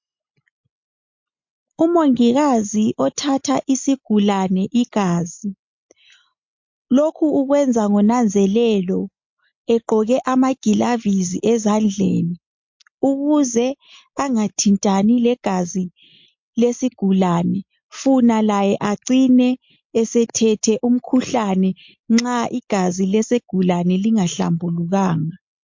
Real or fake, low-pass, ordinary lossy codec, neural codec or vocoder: real; 7.2 kHz; MP3, 48 kbps; none